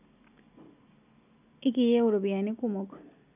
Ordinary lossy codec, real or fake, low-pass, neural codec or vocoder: none; real; 3.6 kHz; none